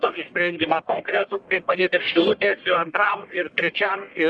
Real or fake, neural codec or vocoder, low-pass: fake; codec, 44.1 kHz, 1.7 kbps, Pupu-Codec; 9.9 kHz